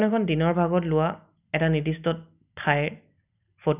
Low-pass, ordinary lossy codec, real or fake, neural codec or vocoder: 3.6 kHz; none; real; none